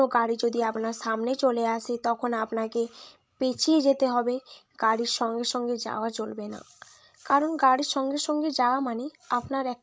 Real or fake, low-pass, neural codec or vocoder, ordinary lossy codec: fake; none; codec, 16 kHz, 16 kbps, FreqCodec, larger model; none